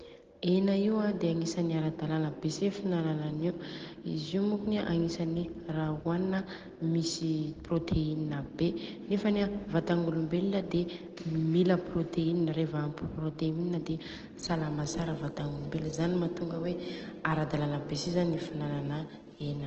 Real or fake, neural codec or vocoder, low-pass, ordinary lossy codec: real; none; 7.2 kHz; Opus, 16 kbps